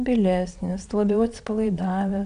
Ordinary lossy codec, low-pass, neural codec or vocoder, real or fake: AAC, 96 kbps; 9.9 kHz; vocoder, 22.05 kHz, 80 mel bands, Vocos; fake